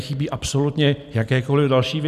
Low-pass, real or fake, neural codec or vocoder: 14.4 kHz; real; none